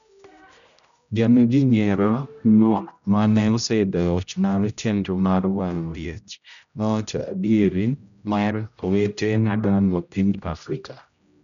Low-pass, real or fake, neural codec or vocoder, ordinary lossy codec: 7.2 kHz; fake; codec, 16 kHz, 0.5 kbps, X-Codec, HuBERT features, trained on general audio; none